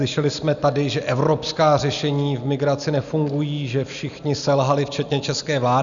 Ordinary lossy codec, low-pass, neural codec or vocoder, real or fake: MP3, 96 kbps; 7.2 kHz; none; real